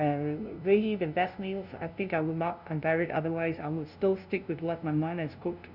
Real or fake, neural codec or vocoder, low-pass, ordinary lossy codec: fake; codec, 16 kHz, 0.5 kbps, FunCodec, trained on LibriTTS, 25 frames a second; 5.4 kHz; none